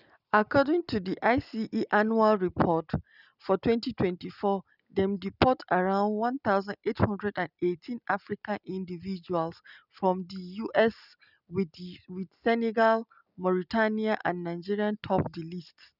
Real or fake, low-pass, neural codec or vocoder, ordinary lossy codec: real; 5.4 kHz; none; none